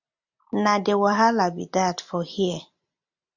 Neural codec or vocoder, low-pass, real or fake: none; 7.2 kHz; real